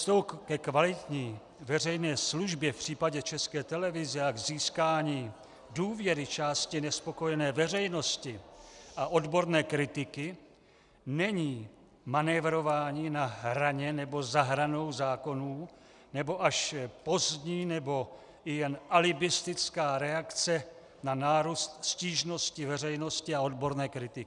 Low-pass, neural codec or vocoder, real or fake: 10.8 kHz; none; real